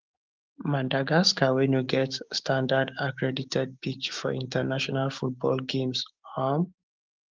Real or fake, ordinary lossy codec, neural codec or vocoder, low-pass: fake; Opus, 32 kbps; codec, 16 kHz, 6 kbps, DAC; 7.2 kHz